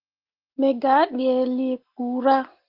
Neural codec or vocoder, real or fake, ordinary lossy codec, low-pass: none; real; Opus, 16 kbps; 5.4 kHz